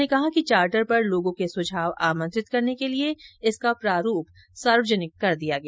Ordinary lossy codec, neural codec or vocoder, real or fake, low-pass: none; none; real; none